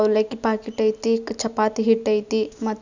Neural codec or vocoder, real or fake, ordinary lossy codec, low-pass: none; real; none; 7.2 kHz